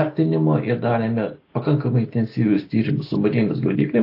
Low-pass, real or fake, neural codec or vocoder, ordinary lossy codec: 5.4 kHz; real; none; AAC, 32 kbps